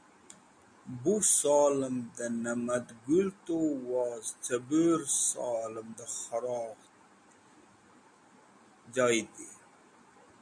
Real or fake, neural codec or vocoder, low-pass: real; none; 9.9 kHz